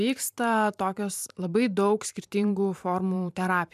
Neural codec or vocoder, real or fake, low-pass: vocoder, 44.1 kHz, 128 mel bands every 512 samples, BigVGAN v2; fake; 14.4 kHz